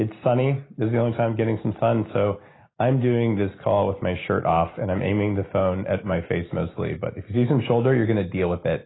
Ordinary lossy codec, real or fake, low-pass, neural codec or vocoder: AAC, 16 kbps; real; 7.2 kHz; none